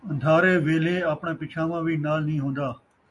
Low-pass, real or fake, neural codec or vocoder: 10.8 kHz; real; none